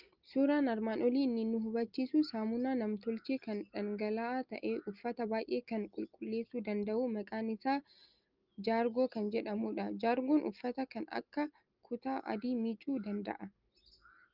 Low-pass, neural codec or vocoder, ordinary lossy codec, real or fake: 5.4 kHz; none; Opus, 24 kbps; real